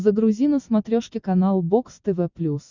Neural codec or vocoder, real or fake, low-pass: none; real; 7.2 kHz